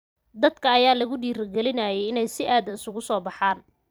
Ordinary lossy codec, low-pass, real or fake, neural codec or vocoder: none; none; fake; vocoder, 44.1 kHz, 128 mel bands every 256 samples, BigVGAN v2